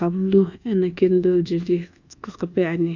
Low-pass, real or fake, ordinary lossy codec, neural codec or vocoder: 7.2 kHz; fake; AAC, 48 kbps; codec, 24 kHz, 1.2 kbps, DualCodec